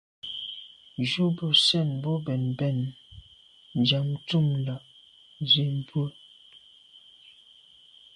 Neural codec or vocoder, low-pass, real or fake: none; 10.8 kHz; real